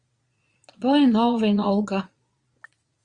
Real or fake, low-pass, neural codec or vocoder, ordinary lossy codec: fake; 9.9 kHz; vocoder, 22.05 kHz, 80 mel bands, Vocos; Opus, 64 kbps